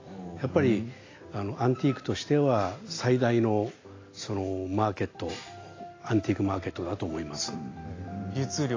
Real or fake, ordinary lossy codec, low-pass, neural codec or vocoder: real; AAC, 32 kbps; 7.2 kHz; none